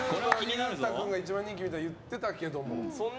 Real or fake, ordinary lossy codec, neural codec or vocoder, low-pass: real; none; none; none